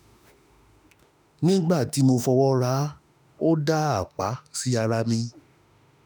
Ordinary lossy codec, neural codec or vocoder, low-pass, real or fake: none; autoencoder, 48 kHz, 32 numbers a frame, DAC-VAE, trained on Japanese speech; none; fake